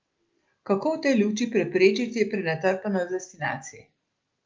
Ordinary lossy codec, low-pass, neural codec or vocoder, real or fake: Opus, 32 kbps; 7.2 kHz; none; real